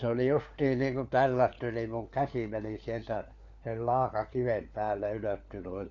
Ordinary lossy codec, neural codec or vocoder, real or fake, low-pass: none; codec, 16 kHz, 4 kbps, FunCodec, trained on LibriTTS, 50 frames a second; fake; 7.2 kHz